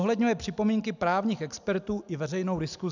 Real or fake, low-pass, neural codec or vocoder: real; 7.2 kHz; none